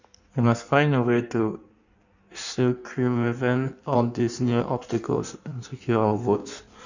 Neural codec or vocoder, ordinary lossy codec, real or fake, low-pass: codec, 16 kHz in and 24 kHz out, 1.1 kbps, FireRedTTS-2 codec; none; fake; 7.2 kHz